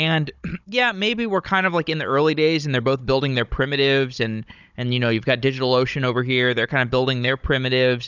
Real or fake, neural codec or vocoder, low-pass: fake; codec, 16 kHz, 16 kbps, FunCodec, trained on Chinese and English, 50 frames a second; 7.2 kHz